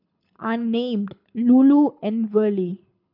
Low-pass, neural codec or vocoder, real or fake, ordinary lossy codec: 5.4 kHz; codec, 24 kHz, 6 kbps, HILCodec; fake; none